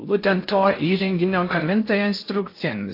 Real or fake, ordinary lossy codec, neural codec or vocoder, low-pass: fake; AAC, 32 kbps; codec, 16 kHz in and 24 kHz out, 0.6 kbps, FocalCodec, streaming, 4096 codes; 5.4 kHz